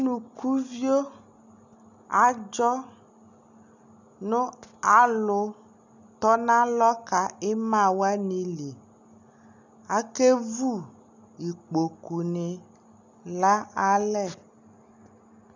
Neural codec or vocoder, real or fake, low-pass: none; real; 7.2 kHz